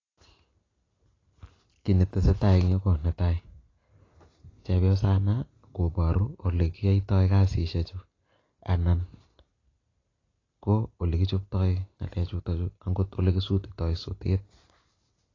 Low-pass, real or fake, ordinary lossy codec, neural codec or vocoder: 7.2 kHz; real; AAC, 32 kbps; none